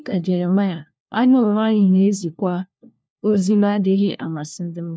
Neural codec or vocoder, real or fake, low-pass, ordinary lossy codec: codec, 16 kHz, 1 kbps, FunCodec, trained on LibriTTS, 50 frames a second; fake; none; none